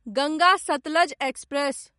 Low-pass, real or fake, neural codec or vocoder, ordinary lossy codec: 19.8 kHz; real; none; MP3, 48 kbps